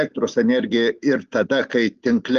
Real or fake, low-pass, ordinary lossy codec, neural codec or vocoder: real; 7.2 kHz; Opus, 32 kbps; none